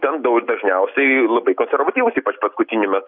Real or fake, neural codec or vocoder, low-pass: real; none; 5.4 kHz